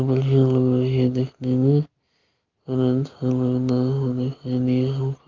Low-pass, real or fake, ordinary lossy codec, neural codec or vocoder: 7.2 kHz; real; Opus, 32 kbps; none